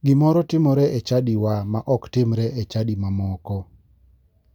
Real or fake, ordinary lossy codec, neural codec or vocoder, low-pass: real; none; none; 19.8 kHz